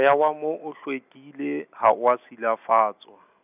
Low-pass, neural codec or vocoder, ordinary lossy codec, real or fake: 3.6 kHz; none; none; real